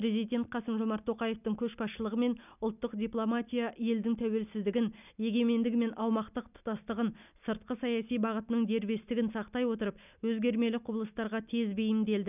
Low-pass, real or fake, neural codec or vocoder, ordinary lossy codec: 3.6 kHz; real; none; none